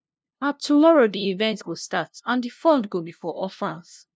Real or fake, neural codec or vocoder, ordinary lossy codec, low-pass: fake; codec, 16 kHz, 0.5 kbps, FunCodec, trained on LibriTTS, 25 frames a second; none; none